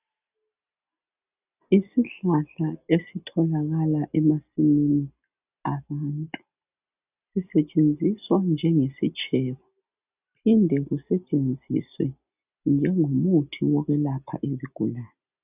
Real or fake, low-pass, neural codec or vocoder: real; 3.6 kHz; none